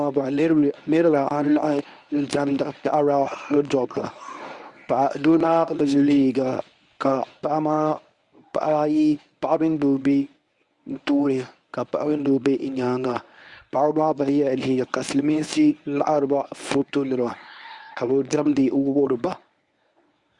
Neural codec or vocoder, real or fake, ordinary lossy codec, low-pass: codec, 24 kHz, 0.9 kbps, WavTokenizer, medium speech release version 1; fake; Opus, 64 kbps; 10.8 kHz